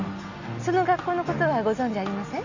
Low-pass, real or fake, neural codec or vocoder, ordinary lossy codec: 7.2 kHz; real; none; none